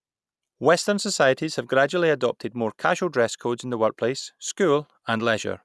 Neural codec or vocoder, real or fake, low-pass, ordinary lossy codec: none; real; none; none